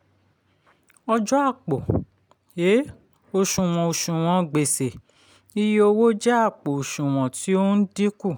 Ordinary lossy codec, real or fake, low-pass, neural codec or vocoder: none; real; none; none